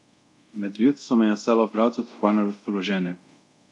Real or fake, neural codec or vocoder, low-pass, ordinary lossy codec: fake; codec, 24 kHz, 0.5 kbps, DualCodec; 10.8 kHz; none